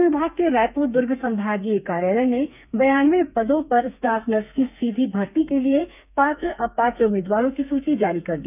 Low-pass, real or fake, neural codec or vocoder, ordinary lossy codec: 3.6 kHz; fake; codec, 32 kHz, 1.9 kbps, SNAC; none